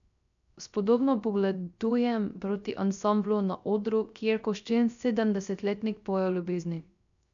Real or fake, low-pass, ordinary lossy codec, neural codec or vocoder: fake; 7.2 kHz; none; codec, 16 kHz, 0.3 kbps, FocalCodec